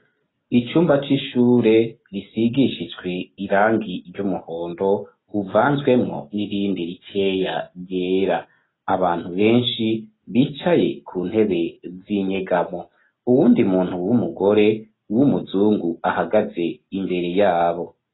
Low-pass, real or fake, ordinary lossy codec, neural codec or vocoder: 7.2 kHz; real; AAC, 16 kbps; none